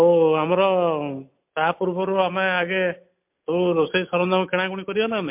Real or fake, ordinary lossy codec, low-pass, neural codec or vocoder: real; MP3, 32 kbps; 3.6 kHz; none